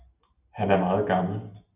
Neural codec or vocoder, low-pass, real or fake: none; 3.6 kHz; real